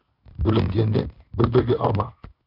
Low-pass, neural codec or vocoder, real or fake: 5.4 kHz; codec, 16 kHz in and 24 kHz out, 1 kbps, XY-Tokenizer; fake